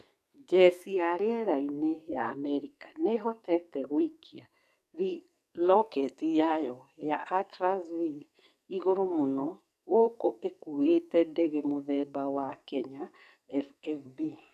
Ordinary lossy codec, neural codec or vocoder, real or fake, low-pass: none; codec, 44.1 kHz, 2.6 kbps, SNAC; fake; 14.4 kHz